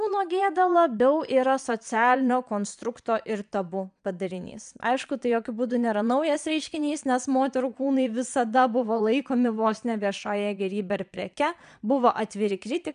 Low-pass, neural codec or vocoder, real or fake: 9.9 kHz; vocoder, 22.05 kHz, 80 mel bands, Vocos; fake